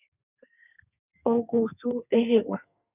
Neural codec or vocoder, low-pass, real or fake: codec, 32 kHz, 1.9 kbps, SNAC; 3.6 kHz; fake